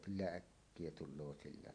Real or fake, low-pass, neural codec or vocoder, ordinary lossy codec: fake; 9.9 kHz; vocoder, 44.1 kHz, 128 mel bands every 512 samples, BigVGAN v2; AAC, 48 kbps